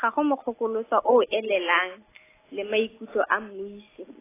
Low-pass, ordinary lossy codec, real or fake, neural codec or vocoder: 3.6 kHz; AAC, 16 kbps; real; none